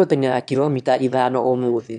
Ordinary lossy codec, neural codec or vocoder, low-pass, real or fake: none; autoencoder, 22.05 kHz, a latent of 192 numbers a frame, VITS, trained on one speaker; 9.9 kHz; fake